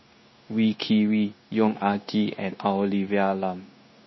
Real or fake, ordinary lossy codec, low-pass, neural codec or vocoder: fake; MP3, 24 kbps; 7.2 kHz; codec, 16 kHz, 0.9 kbps, LongCat-Audio-Codec